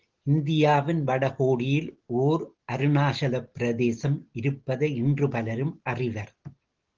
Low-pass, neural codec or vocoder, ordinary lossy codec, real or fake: 7.2 kHz; none; Opus, 16 kbps; real